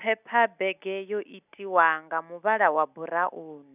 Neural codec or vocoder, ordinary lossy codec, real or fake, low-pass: none; none; real; 3.6 kHz